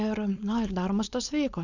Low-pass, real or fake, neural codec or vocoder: 7.2 kHz; fake; codec, 16 kHz, 4.8 kbps, FACodec